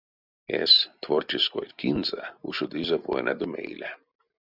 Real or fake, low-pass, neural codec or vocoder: real; 5.4 kHz; none